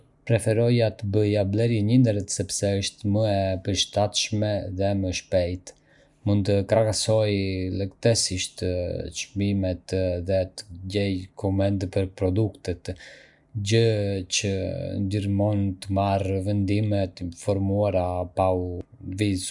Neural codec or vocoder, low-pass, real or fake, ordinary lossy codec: none; 10.8 kHz; real; none